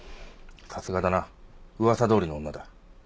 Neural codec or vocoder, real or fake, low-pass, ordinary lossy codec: none; real; none; none